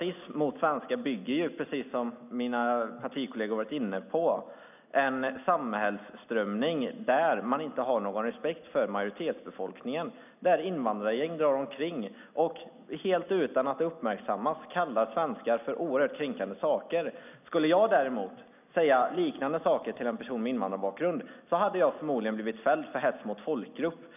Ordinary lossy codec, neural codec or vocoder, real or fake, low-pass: none; none; real; 3.6 kHz